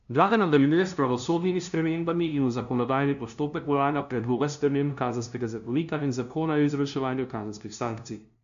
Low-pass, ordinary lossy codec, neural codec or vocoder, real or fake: 7.2 kHz; MP3, 96 kbps; codec, 16 kHz, 0.5 kbps, FunCodec, trained on LibriTTS, 25 frames a second; fake